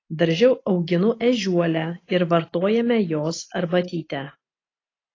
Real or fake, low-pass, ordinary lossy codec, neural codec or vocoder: real; 7.2 kHz; AAC, 32 kbps; none